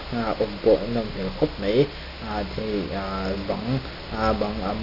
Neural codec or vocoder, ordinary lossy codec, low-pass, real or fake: none; none; 5.4 kHz; real